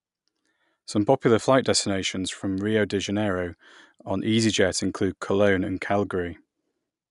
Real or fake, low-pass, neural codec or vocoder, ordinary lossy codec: real; 10.8 kHz; none; none